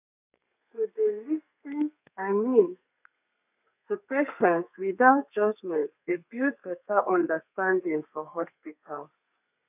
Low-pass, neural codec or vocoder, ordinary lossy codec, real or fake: 3.6 kHz; codec, 32 kHz, 1.9 kbps, SNAC; none; fake